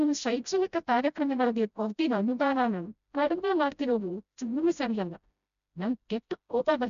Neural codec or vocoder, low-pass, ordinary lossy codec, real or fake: codec, 16 kHz, 0.5 kbps, FreqCodec, smaller model; 7.2 kHz; none; fake